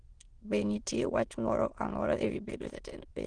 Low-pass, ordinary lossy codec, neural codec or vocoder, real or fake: 9.9 kHz; Opus, 16 kbps; autoencoder, 22.05 kHz, a latent of 192 numbers a frame, VITS, trained on many speakers; fake